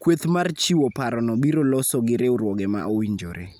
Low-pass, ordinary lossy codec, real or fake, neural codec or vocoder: none; none; real; none